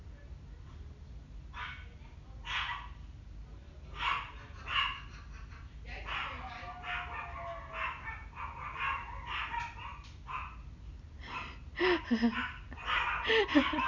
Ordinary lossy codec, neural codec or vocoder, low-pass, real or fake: none; none; 7.2 kHz; real